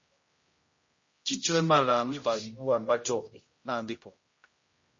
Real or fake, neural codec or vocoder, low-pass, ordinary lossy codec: fake; codec, 16 kHz, 0.5 kbps, X-Codec, HuBERT features, trained on general audio; 7.2 kHz; MP3, 32 kbps